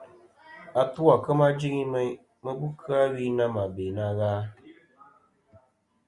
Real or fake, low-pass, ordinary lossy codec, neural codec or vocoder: real; 10.8 kHz; Opus, 64 kbps; none